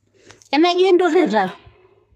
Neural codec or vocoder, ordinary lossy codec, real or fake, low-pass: codec, 32 kHz, 1.9 kbps, SNAC; none; fake; 14.4 kHz